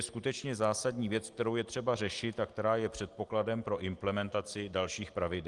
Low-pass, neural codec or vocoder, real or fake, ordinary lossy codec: 10.8 kHz; none; real; Opus, 24 kbps